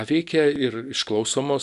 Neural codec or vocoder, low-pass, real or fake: none; 10.8 kHz; real